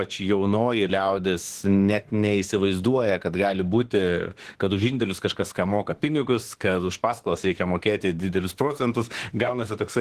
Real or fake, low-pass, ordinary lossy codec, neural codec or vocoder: fake; 14.4 kHz; Opus, 16 kbps; autoencoder, 48 kHz, 32 numbers a frame, DAC-VAE, trained on Japanese speech